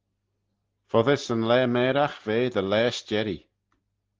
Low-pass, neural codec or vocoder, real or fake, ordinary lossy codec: 7.2 kHz; none; real; Opus, 16 kbps